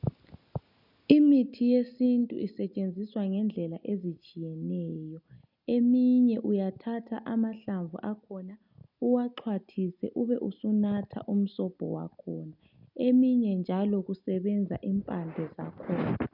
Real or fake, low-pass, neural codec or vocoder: real; 5.4 kHz; none